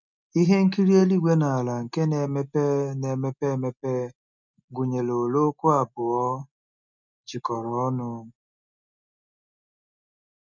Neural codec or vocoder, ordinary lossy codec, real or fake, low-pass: none; none; real; 7.2 kHz